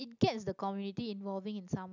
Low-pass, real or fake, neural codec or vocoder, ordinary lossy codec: 7.2 kHz; real; none; none